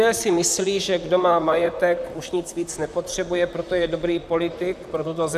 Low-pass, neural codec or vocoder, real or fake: 14.4 kHz; vocoder, 44.1 kHz, 128 mel bands, Pupu-Vocoder; fake